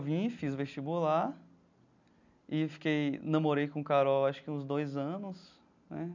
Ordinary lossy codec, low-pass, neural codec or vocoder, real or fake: none; 7.2 kHz; none; real